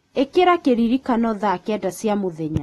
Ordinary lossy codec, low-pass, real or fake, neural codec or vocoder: AAC, 32 kbps; 19.8 kHz; real; none